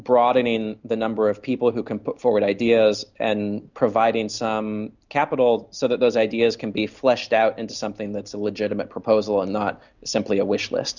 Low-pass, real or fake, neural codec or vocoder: 7.2 kHz; real; none